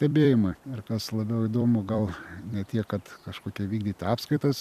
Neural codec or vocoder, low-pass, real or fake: vocoder, 44.1 kHz, 128 mel bands every 256 samples, BigVGAN v2; 14.4 kHz; fake